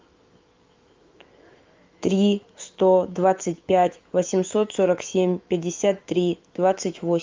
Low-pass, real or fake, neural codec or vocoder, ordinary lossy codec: 7.2 kHz; fake; autoencoder, 48 kHz, 128 numbers a frame, DAC-VAE, trained on Japanese speech; Opus, 24 kbps